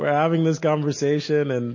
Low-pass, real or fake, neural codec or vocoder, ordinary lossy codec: 7.2 kHz; real; none; MP3, 32 kbps